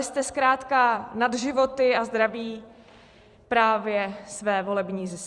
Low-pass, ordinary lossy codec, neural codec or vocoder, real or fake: 10.8 kHz; Opus, 64 kbps; vocoder, 44.1 kHz, 128 mel bands every 256 samples, BigVGAN v2; fake